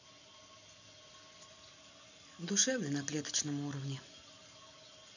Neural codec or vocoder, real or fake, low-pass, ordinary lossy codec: vocoder, 44.1 kHz, 128 mel bands every 256 samples, BigVGAN v2; fake; 7.2 kHz; none